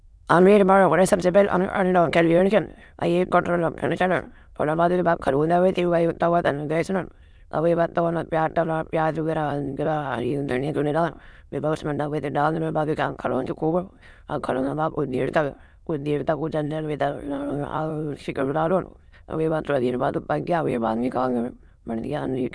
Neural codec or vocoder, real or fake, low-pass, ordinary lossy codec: autoencoder, 22.05 kHz, a latent of 192 numbers a frame, VITS, trained on many speakers; fake; none; none